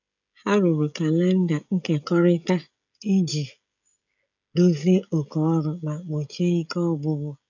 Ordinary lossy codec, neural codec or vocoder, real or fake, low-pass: none; codec, 16 kHz, 16 kbps, FreqCodec, smaller model; fake; 7.2 kHz